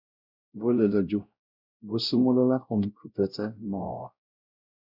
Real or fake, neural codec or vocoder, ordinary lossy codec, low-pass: fake; codec, 16 kHz, 0.5 kbps, X-Codec, WavLM features, trained on Multilingual LibriSpeech; Opus, 64 kbps; 5.4 kHz